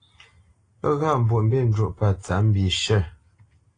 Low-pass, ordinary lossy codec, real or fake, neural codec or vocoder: 9.9 kHz; AAC, 32 kbps; real; none